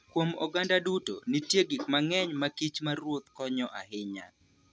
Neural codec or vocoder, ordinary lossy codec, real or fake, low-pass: none; none; real; none